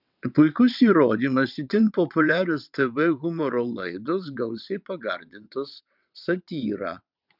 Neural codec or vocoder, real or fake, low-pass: vocoder, 22.05 kHz, 80 mel bands, WaveNeXt; fake; 5.4 kHz